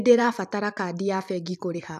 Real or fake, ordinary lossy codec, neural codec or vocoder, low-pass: fake; none; vocoder, 44.1 kHz, 128 mel bands every 512 samples, BigVGAN v2; 14.4 kHz